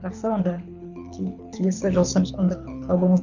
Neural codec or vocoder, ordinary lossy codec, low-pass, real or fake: codec, 24 kHz, 6 kbps, HILCodec; none; 7.2 kHz; fake